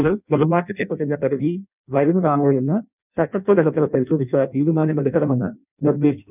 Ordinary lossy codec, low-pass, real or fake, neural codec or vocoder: none; 3.6 kHz; fake; codec, 16 kHz in and 24 kHz out, 0.6 kbps, FireRedTTS-2 codec